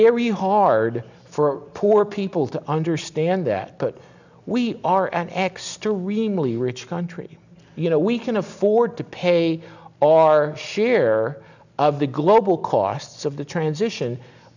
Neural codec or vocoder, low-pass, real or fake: none; 7.2 kHz; real